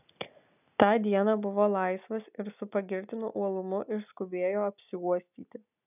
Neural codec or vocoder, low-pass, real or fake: none; 3.6 kHz; real